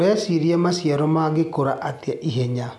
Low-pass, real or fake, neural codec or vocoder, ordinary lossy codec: none; real; none; none